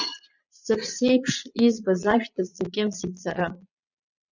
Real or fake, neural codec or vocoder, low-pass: fake; vocoder, 22.05 kHz, 80 mel bands, Vocos; 7.2 kHz